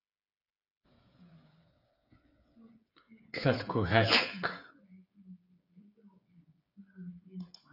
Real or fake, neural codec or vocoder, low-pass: fake; codec, 16 kHz, 8 kbps, FreqCodec, smaller model; 5.4 kHz